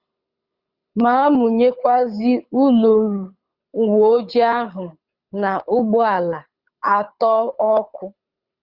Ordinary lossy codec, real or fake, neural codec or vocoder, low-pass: Opus, 64 kbps; fake; codec, 24 kHz, 6 kbps, HILCodec; 5.4 kHz